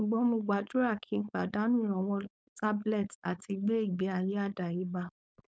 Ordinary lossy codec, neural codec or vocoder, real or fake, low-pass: none; codec, 16 kHz, 4.8 kbps, FACodec; fake; none